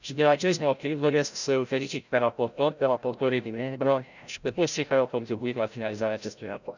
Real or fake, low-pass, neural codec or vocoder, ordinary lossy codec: fake; 7.2 kHz; codec, 16 kHz, 0.5 kbps, FreqCodec, larger model; none